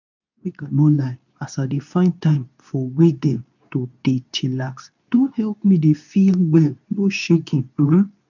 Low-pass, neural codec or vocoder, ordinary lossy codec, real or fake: 7.2 kHz; codec, 24 kHz, 0.9 kbps, WavTokenizer, medium speech release version 2; none; fake